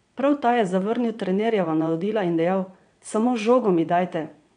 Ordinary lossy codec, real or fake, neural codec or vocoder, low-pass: none; fake; vocoder, 22.05 kHz, 80 mel bands, WaveNeXt; 9.9 kHz